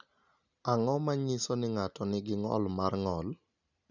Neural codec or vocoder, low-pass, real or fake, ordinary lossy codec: none; 7.2 kHz; real; none